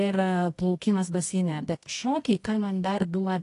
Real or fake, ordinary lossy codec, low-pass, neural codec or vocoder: fake; AAC, 48 kbps; 10.8 kHz; codec, 24 kHz, 0.9 kbps, WavTokenizer, medium music audio release